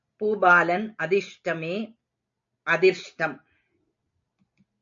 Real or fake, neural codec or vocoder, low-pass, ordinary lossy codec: real; none; 7.2 kHz; AAC, 64 kbps